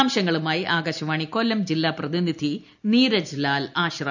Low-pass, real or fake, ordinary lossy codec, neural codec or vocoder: 7.2 kHz; real; none; none